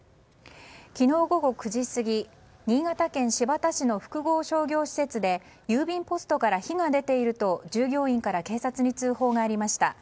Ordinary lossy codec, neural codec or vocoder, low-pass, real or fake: none; none; none; real